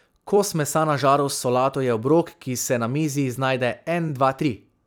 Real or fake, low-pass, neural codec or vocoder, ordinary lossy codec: fake; none; vocoder, 44.1 kHz, 128 mel bands every 256 samples, BigVGAN v2; none